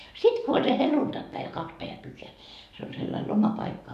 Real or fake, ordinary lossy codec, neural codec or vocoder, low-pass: fake; none; codec, 44.1 kHz, 7.8 kbps, DAC; 14.4 kHz